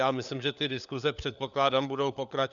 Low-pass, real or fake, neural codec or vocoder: 7.2 kHz; fake; codec, 16 kHz, 4 kbps, FunCodec, trained on LibriTTS, 50 frames a second